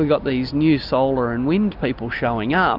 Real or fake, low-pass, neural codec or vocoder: real; 5.4 kHz; none